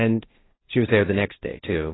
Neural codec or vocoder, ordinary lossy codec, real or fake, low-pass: codec, 16 kHz, 1.1 kbps, Voila-Tokenizer; AAC, 16 kbps; fake; 7.2 kHz